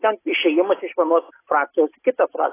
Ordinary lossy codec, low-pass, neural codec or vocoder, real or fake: AAC, 16 kbps; 3.6 kHz; none; real